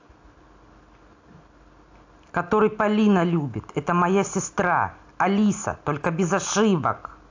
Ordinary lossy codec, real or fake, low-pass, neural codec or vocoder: AAC, 48 kbps; real; 7.2 kHz; none